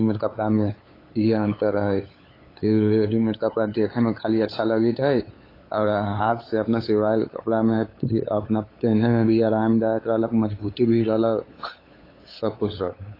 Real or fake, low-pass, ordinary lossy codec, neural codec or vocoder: fake; 5.4 kHz; AAC, 24 kbps; codec, 16 kHz, 8 kbps, FunCodec, trained on LibriTTS, 25 frames a second